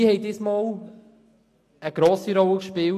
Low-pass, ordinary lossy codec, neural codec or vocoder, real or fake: 14.4 kHz; AAC, 64 kbps; none; real